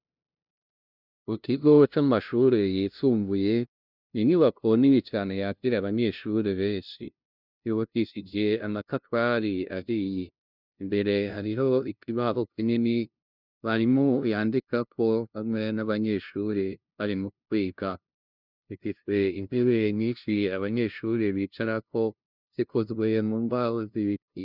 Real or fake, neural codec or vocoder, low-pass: fake; codec, 16 kHz, 0.5 kbps, FunCodec, trained on LibriTTS, 25 frames a second; 5.4 kHz